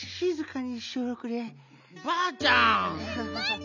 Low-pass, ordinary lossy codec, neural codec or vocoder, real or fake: 7.2 kHz; none; none; real